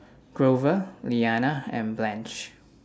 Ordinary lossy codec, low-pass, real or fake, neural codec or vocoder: none; none; real; none